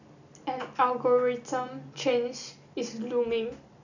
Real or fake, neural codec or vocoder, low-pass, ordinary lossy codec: real; none; 7.2 kHz; none